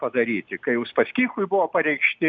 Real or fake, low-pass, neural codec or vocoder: real; 7.2 kHz; none